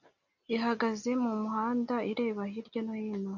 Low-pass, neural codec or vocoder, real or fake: 7.2 kHz; none; real